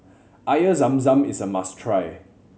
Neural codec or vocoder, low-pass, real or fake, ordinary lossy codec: none; none; real; none